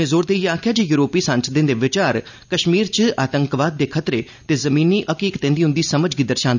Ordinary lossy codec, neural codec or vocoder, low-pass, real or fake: none; none; 7.2 kHz; real